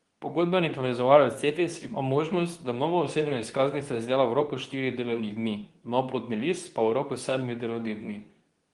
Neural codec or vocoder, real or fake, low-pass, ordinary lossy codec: codec, 24 kHz, 0.9 kbps, WavTokenizer, medium speech release version 2; fake; 10.8 kHz; Opus, 24 kbps